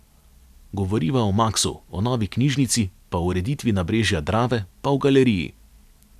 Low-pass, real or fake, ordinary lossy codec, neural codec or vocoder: 14.4 kHz; real; none; none